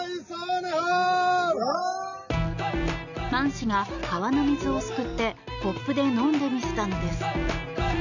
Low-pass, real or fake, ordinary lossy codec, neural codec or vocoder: 7.2 kHz; real; none; none